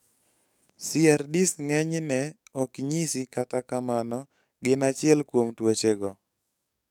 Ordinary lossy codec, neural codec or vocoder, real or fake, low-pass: none; codec, 44.1 kHz, 7.8 kbps, DAC; fake; 19.8 kHz